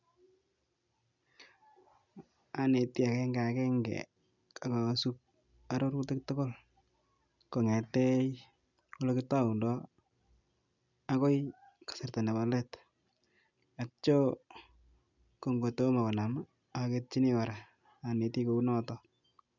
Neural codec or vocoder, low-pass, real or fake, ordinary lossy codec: none; 7.2 kHz; real; none